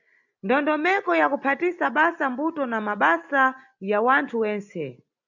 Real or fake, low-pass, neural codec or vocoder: real; 7.2 kHz; none